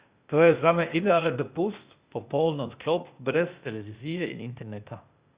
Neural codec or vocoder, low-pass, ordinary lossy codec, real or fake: codec, 16 kHz, 0.8 kbps, ZipCodec; 3.6 kHz; Opus, 64 kbps; fake